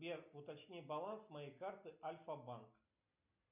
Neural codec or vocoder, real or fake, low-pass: none; real; 3.6 kHz